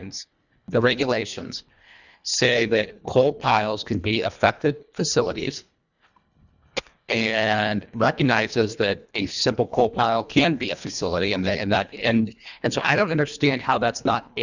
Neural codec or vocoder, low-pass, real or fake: codec, 24 kHz, 1.5 kbps, HILCodec; 7.2 kHz; fake